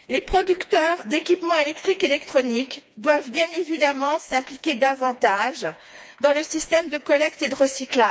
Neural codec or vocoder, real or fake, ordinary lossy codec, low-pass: codec, 16 kHz, 2 kbps, FreqCodec, smaller model; fake; none; none